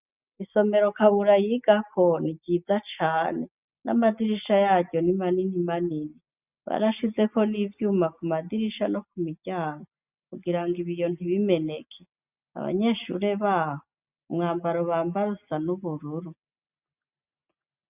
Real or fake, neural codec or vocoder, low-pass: real; none; 3.6 kHz